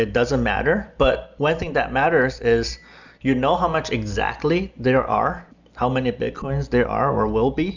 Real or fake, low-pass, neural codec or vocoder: real; 7.2 kHz; none